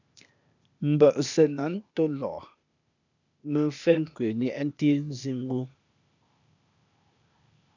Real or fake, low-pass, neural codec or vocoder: fake; 7.2 kHz; codec, 16 kHz, 0.8 kbps, ZipCodec